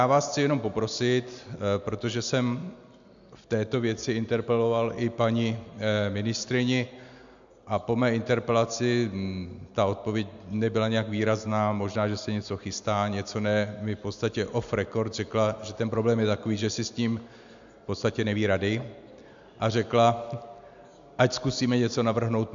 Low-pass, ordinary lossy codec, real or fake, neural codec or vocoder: 7.2 kHz; MP3, 64 kbps; real; none